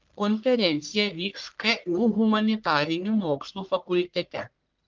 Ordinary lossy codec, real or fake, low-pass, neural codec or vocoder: Opus, 24 kbps; fake; 7.2 kHz; codec, 44.1 kHz, 1.7 kbps, Pupu-Codec